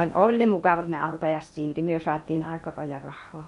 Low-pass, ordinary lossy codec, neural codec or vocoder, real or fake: 10.8 kHz; none; codec, 16 kHz in and 24 kHz out, 0.8 kbps, FocalCodec, streaming, 65536 codes; fake